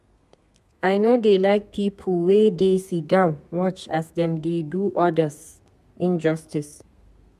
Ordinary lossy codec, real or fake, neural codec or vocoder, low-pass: MP3, 96 kbps; fake; codec, 32 kHz, 1.9 kbps, SNAC; 14.4 kHz